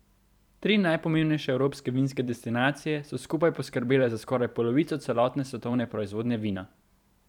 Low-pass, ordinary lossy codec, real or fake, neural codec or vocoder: 19.8 kHz; none; fake; vocoder, 44.1 kHz, 128 mel bands every 512 samples, BigVGAN v2